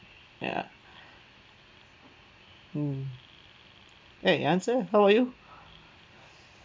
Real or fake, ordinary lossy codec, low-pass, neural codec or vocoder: real; none; none; none